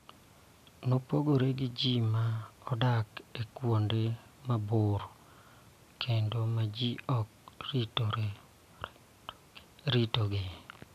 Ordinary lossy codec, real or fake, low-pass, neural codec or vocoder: AAC, 96 kbps; real; 14.4 kHz; none